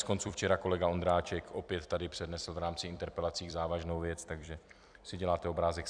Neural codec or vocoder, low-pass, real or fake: vocoder, 44.1 kHz, 128 mel bands every 256 samples, BigVGAN v2; 9.9 kHz; fake